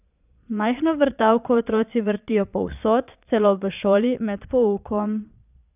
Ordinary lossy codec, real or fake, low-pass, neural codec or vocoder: none; fake; 3.6 kHz; vocoder, 22.05 kHz, 80 mel bands, Vocos